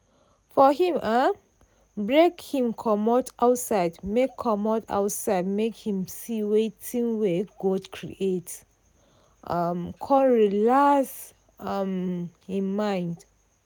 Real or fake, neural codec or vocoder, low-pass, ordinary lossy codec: real; none; none; none